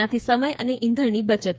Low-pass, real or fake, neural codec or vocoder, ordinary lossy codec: none; fake; codec, 16 kHz, 4 kbps, FreqCodec, smaller model; none